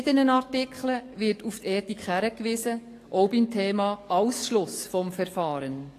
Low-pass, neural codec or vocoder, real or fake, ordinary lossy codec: 14.4 kHz; codec, 44.1 kHz, 7.8 kbps, DAC; fake; AAC, 48 kbps